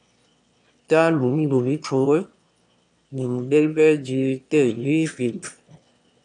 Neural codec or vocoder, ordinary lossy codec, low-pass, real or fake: autoencoder, 22.05 kHz, a latent of 192 numbers a frame, VITS, trained on one speaker; AAC, 64 kbps; 9.9 kHz; fake